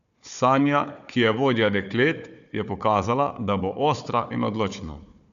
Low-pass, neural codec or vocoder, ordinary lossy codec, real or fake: 7.2 kHz; codec, 16 kHz, 4 kbps, FunCodec, trained on Chinese and English, 50 frames a second; none; fake